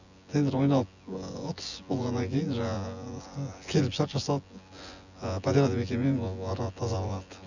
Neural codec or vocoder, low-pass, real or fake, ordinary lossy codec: vocoder, 24 kHz, 100 mel bands, Vocos; 7.2 kHz; fake; none